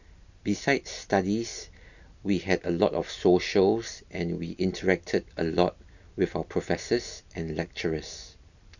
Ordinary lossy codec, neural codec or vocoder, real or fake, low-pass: none; none; real; 7.2 kHz